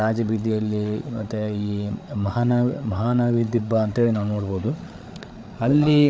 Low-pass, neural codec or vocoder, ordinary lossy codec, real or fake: none; codec, 16 kHz, 8 kbps, FreqCodec, larger model; none; fake